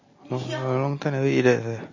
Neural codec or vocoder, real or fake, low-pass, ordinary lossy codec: none; real; 7.2 kHz; MP3, 32 kbps